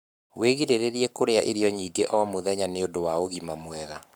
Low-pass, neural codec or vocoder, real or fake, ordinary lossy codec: none; codec, 44.1 kHz, 7.8 kbps, Pupu-Codec; fake; none